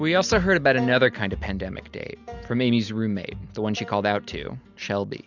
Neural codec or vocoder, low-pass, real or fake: none; 7.2 kHz; real